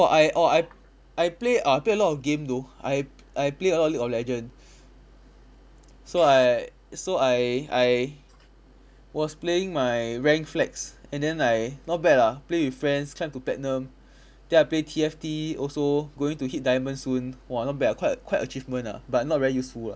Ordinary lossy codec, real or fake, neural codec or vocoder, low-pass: none; real; none; none